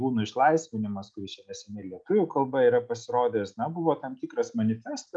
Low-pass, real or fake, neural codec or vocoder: 9.9 kHz; real; none